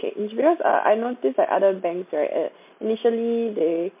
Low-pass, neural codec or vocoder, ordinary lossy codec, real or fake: 3.6 kHz; none; MP3, 32 kbps; real